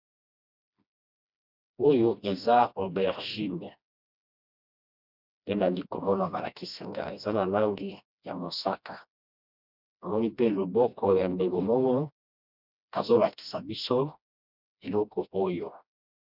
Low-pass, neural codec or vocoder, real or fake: 5.4 kHz; codec, 16 kHz, 1 kbps, FreqCodec, smaller model; fake